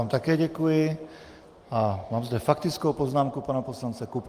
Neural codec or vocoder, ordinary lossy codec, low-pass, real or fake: none; Opus, 24 kbps; 14.4 kHz; real